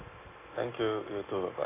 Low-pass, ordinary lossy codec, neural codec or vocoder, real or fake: 3.6 kHz; AAC, 16 kbps; none; real